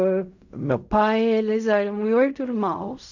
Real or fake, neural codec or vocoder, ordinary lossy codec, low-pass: fake; codec, 16 kHz in and 24 kHz out, 0.4 kbps, LongCat-Audio-Codec, fine tuned four codebook decoder; none; 7.2 kHz